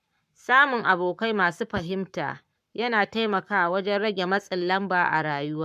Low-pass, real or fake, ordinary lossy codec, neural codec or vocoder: 14.4 kHz; fake; none; codec, 44.1 kHz, 7.8 kbps, Pupu-Codec